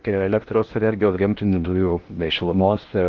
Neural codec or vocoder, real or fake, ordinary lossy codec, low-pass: codec, 16 kHz in and 24 kHz out, 0.6 kbps, FocalCodec, streaming, 2048 codes; fake; Opus, 32 kbps; 7.2 kHz